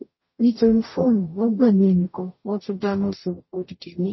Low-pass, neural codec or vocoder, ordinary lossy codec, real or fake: 7.2 kHz; codec, 44.1 kHz, 0.9 kbps, DAC; MP3, 24 kbps; fake